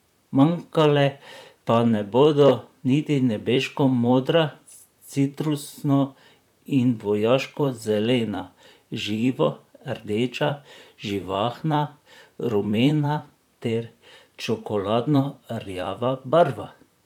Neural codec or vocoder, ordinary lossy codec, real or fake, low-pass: vocoder, 44.1 kHz, 128 mel bands, Pupu-Vocoder; none; fake; 19.8 kHz